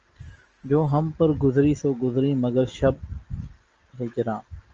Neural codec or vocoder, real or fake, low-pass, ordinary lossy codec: none; real; 7.2 kHz; Opus, 24 kbps